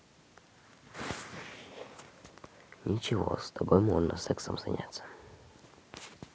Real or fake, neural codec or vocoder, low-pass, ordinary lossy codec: real; none; none; none